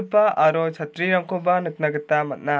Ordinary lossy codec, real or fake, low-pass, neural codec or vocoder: none; real; none; none